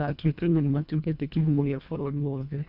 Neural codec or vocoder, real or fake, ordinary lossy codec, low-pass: codec, 24 kHz, 1.5 kbps, HILCodec; fake; none; 5.4 kHz